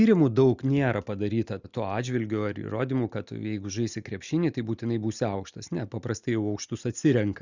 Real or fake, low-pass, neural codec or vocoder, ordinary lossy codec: real; 7.2 kHz; none; Opus, 64 kbps